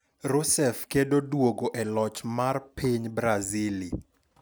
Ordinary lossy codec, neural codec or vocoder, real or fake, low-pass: none; none; real; none